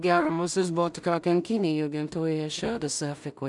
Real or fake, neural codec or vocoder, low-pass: fake; codec, 16 kHz in and 24 kHz out, 0.4 kbps, LongCat-Audio-Codec, two codebook decoder; 10.8 kHz